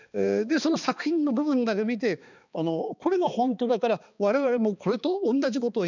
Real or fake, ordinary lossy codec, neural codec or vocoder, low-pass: fake; none; codec, 16 kHz, 2 kbps, X-Codec, HuBERT features, trained on balanced general audio; 7.2 kHz